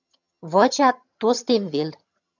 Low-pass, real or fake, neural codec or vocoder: 7.2 kHz; fake; vocoder, 22.05 kHz, 80 mel bands, HiFi-GAN